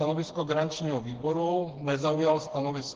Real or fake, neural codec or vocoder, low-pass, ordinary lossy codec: fake; codec, 16 kHz, 2 kbps, FreqCodec, smaller model; 7.2 kHz; Opus, 16 kbps